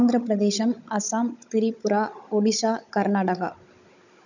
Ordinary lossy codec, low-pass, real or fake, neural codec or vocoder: none; 7.2 kHz; fake; codec, 16 kHz, 16 kbps, FunCodec, trained on Chinese and English, 50 frames a second